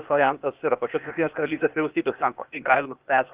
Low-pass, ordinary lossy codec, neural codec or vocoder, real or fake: 3.6 kHz; Opus, 32 kbps; codec, 16 kHz, 0.8 kbps, ZipCodec; fake